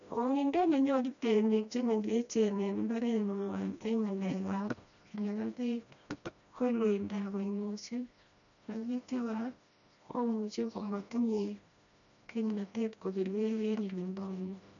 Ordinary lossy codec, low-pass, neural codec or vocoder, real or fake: none; 7.2 kHz; codec, 16 kHz, 1 kbps, FreqCodec, smaller model; fake